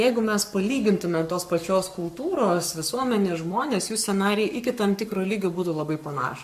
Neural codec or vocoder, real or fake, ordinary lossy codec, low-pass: codec, 44.1 kHz, 7.8 kbps, Pupu-Codec; fake; MP3, 96 kbps; 14.4 kHz